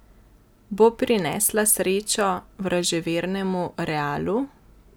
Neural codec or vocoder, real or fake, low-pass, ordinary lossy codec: none; real; none; none